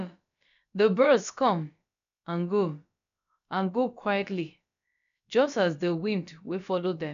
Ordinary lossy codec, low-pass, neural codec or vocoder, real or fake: MP3, 96 kbps; 7.2 kHz; codec, 16 kHz, about 1 kbps, DyCAST, with the encoder's durations; fake